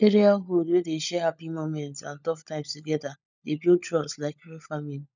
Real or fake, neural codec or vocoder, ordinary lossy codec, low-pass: fake; codec, 16 kHz, 16 kbps, FunCodec, trained on LibriTTS, 50 frames a second; none; 7.2 kHz